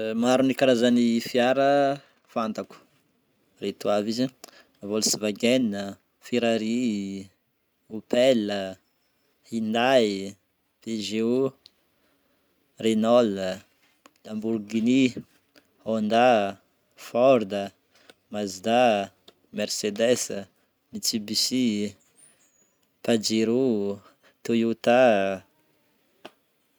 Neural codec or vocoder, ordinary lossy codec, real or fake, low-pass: none; none; real; none